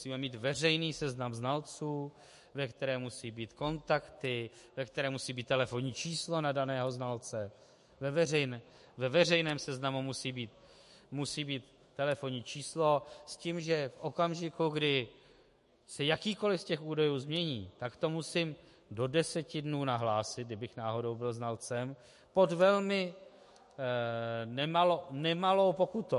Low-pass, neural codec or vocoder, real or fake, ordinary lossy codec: 14.4 kHz; autoencoder, 48 kHz, 128 numbers a frame, DAC-VAE, trained on Japanese speech; fake; MP3, 48 kbps